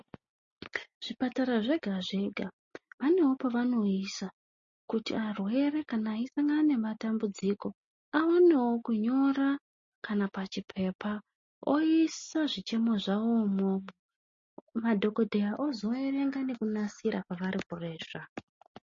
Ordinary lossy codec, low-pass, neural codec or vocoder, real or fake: MP3, 32 kbps; 7.2 kHz; none; real